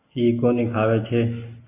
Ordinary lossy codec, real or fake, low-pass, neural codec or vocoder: AAC, 24 kbps; real; 3.6 kHz; none